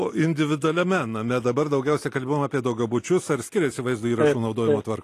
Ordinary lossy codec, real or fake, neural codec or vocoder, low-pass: AAC, 48 kbps; real; none; 14.4 kHz